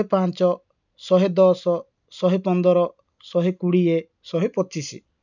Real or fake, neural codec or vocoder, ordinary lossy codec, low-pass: real; none; none; 7.2 kHz